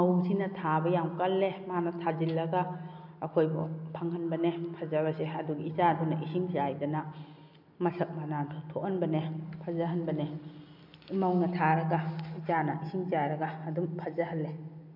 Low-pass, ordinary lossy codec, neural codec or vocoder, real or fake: 5.4 kHz; MP3, 48 kbps; none; real